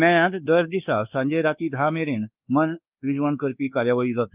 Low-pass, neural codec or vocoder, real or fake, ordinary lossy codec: 3.6 kHz; codec, 24 kHz, 1.2 kbps, DualCodec; fake; Opus, 32 kbps